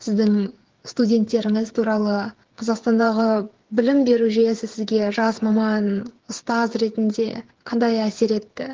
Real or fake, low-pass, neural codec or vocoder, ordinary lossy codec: fake; 7.2 kHz; codec, 16 kHz, 8 kbps, FreqCodec, smaller model; Opus, 16 kbps